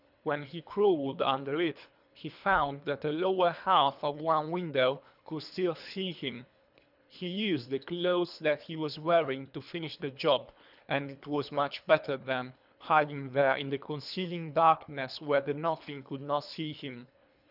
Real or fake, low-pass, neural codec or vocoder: fake; 5.4 kHz; codec, 24 kHz, 3 kbps, HILCodec